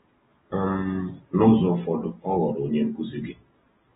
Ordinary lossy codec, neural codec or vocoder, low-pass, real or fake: AAC, 16 kbps; none; 19.8 kHz; real